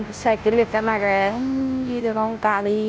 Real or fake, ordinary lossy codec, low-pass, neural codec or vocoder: fake; none; none; codec, 16 kHz, 0.5 kbps, FunCodec, trained on Chinese and English, 25 frames a second